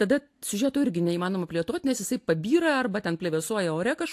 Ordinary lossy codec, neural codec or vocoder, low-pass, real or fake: AAC, 64 kbps; none; 14.4 kHz; real